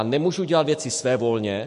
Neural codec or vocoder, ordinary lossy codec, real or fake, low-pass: autoencoder, 48 kHz, 128 numbers a frame, DAC-VAE, trained on Japanese speech; MP3, 48 kbps; fake; 14.4 kHz